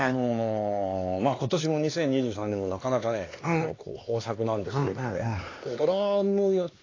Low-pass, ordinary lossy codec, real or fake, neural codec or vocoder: 7.2 kHz; AAC, 32 kbps; fake; codec, 16 kHz, 2 kbps, X-Codec, HuBERT features, trained on LibriSpeech